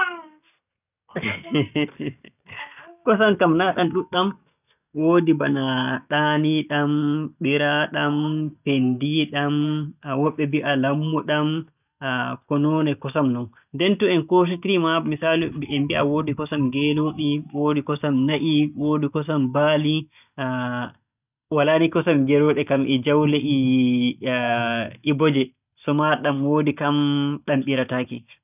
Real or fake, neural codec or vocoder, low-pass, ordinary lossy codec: real; none; 3.6 kHz; none